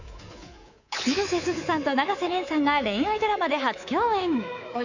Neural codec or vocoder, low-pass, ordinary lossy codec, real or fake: codec, 24 kHz, 3.1 kbps, DualCodec; 7.2 kHz; none; fake